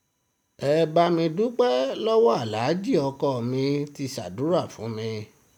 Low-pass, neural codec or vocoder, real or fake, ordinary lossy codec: 19.8 kHz; none; real; none